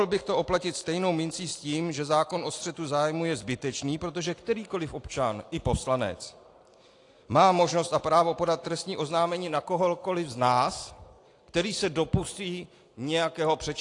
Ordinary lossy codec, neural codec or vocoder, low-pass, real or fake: AAC, 48 kbps; none; 10.8 kHz; real